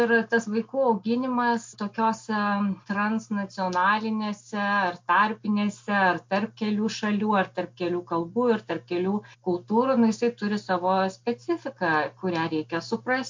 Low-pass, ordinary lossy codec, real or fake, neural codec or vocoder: 7.2 kHz; MP3, 48 kbps; real; none